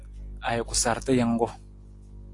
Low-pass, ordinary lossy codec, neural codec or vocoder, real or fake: 10.8 kHz; AAC, 48 kbps; none; real